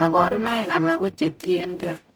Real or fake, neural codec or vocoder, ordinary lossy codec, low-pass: fake; codec, 44.1 kHz, 0.9 kbps, DAC; none; none